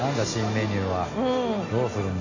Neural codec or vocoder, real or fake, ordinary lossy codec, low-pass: none; real; AAC, 48 kbps; 7.2 kHz